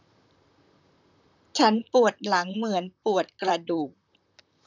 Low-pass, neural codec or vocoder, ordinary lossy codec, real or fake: 7.2 kHz; vocoder, 44.1 kHz, 128 mel bands, Pupu-Vocoder; none; fake